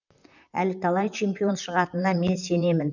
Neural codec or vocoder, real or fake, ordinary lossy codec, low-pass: vocoder, 44.1 kHz, 128 mel bands, Pupu-Vocoder; fake; none; 7.2 kHz